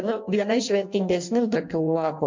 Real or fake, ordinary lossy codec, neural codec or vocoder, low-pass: fake; MP3, 64 kbps; codec, 16 kHz in and 24 kHz out, 0.6 kbps, FireRedTTS-2 codec; 7.2 kHz